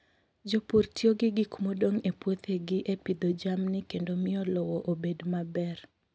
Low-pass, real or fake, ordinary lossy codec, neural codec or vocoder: none; real; none; none